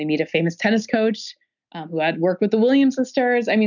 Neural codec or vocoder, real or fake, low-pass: none; real; 7.2 kHz